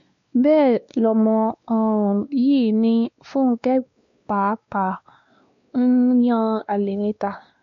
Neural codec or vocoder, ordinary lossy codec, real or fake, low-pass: codec, 16 kHz, 2 kbps, X-Codec, WavLM features, trained on Multilingual LibriSpeech; MP3, 48 kbps; fake; 7.2 kHz